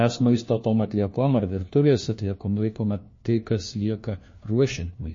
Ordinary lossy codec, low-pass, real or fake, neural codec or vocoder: MP3, 32 kbps; 7.2 kHz; fake; codec, 16 kHz, 1 kbps, FunCodec, trained on LibriTTS, 50 frames a second